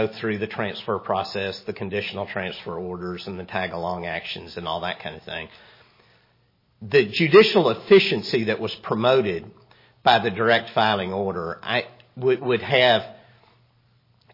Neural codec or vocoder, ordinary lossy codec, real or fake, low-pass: none; MP3, 24 kbps; real; 5.4 kHz